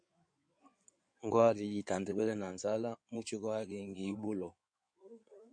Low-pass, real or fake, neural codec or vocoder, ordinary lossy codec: 9.9 kHz; fake; codec, 16 kHz in and 24 kHz out, 2.2 kbps, FireRedTTS-2 codec; MP3, 48 kbps